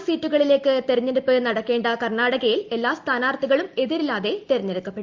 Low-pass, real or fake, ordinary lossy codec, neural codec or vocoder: 7.2 kHz; real; Opus, 32 kbps; none